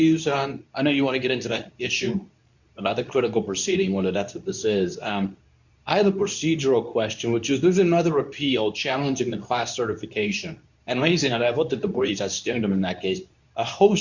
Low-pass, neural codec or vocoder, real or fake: 7.2 kHz; codec, 24 kHz, 0.9 kbps, WavTokenizer, medium speech release version 2; fake